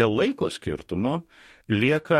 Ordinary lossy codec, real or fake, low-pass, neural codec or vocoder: MP3, 64 kbps; fake; 19.8 kHz; codec, 44.1 kHz, 2.6 kbps, DAC